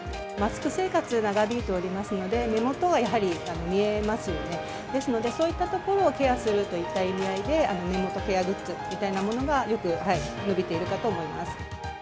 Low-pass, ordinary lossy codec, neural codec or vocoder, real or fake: none; none; none; real